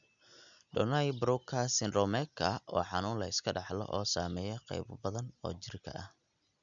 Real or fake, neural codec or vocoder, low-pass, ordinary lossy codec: real; none; 7.2 kHz; none